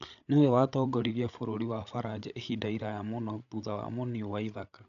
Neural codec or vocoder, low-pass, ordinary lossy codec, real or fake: codec, 16 kHz, 8 kbps, FreqCodec, larger model; 7.2 kHz; none; fake